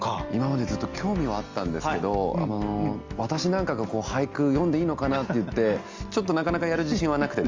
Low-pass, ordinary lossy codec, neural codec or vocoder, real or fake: 7.2 kHz; Opus, 32 kbps; none; real